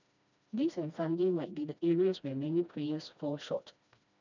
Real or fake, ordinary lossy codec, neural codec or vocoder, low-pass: fake; none; codec, 16 kHz, 1 kbps, FreqCodec, smaller model; 7.2 kHz